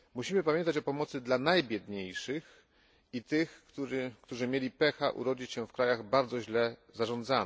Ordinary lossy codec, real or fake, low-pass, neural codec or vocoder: none; real; none; none